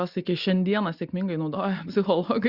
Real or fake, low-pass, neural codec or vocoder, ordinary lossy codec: real; 5.4 kHz; none; Opus, 64 kbps